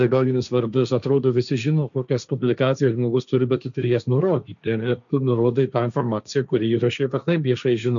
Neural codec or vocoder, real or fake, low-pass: codec, 16 kHz, 1.1 kbps, Voila-Tokenizer; fake; 7.2 kHz